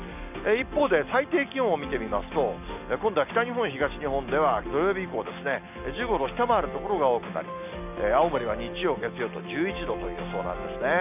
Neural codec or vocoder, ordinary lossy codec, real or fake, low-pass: none; none; real; 3.6 kHz